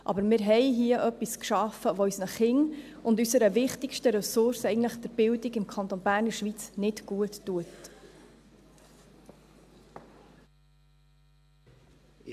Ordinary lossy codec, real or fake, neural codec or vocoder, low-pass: AAC, 96 kbps; real; none; 14.4 kHz